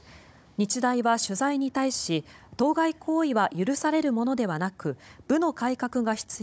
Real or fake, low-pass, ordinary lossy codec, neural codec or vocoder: fake; none; none; codec, 16 kHz, 16 kbps, FunCodec, trained on Chinese and English, 50 frames a second